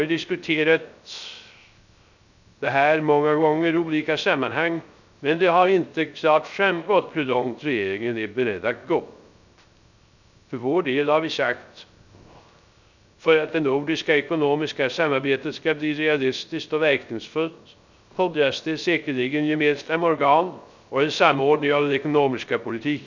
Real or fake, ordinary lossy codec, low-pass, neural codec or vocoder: fake; none; 7.2 kHz; codec, 16 kHz, 0.3 kbps, FocalCodec